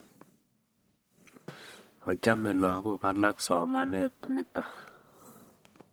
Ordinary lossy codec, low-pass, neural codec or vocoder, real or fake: none; none; codec, 44.1 kHz, 1.7 kbps, Pupu-Codec; fake